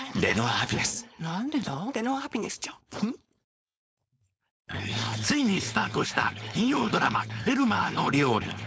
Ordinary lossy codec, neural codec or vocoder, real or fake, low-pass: none; codec, 16 kHz, 4.8 kbps, FACodec; fake; none